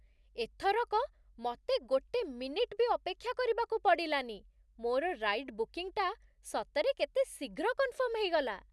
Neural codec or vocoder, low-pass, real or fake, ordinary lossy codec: none; none; real; none